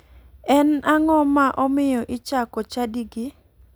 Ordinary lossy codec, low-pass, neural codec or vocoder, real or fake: none; none; none; real